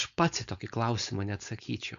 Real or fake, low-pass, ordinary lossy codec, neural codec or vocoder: fake; 7.2 kHz; AAC, 96 kbps; codec, 16 kHz, 4.8 kbps, FACodec